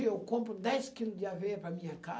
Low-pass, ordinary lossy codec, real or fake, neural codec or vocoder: none; none; real; none